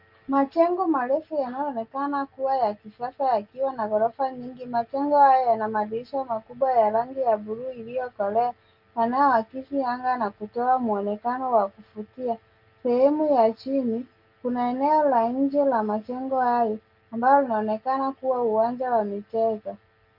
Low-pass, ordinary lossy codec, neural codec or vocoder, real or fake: 5.4 kHz; Opus, 24 kbps; none; real